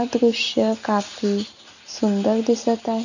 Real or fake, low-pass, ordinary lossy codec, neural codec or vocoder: real; 7.2 kHz; none; none